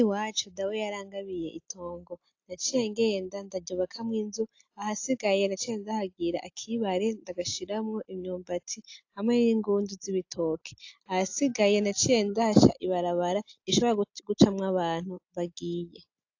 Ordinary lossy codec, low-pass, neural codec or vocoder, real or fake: AAC, 48 kbps; 7.2 kHz; none; real